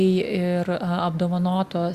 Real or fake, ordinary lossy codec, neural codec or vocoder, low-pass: real; Opus, 64 kbps; none; 14.4 kHz